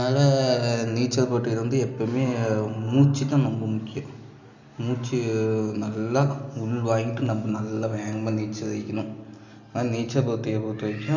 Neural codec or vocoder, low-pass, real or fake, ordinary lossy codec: none; 7.2 kHz; real; none